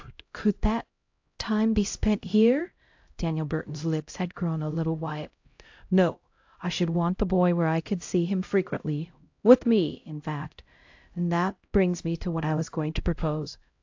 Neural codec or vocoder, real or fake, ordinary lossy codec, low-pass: codec, 16 kHz, 0.5 kbps, X-Codec, HuBERT features, trained on LibriSpeech; fake; AAC, 48 kbps; 7.2 kHz